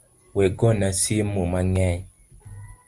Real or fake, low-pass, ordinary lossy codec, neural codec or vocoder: real; 10.8 kHz; Opus, 32 kbps; none